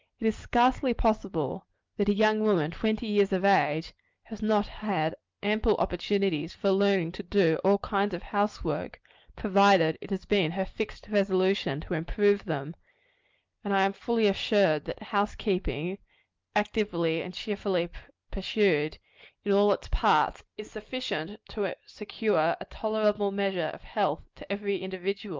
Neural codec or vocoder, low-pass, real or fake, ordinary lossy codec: none; 7.2 kHz; real; Opus, 24 kbps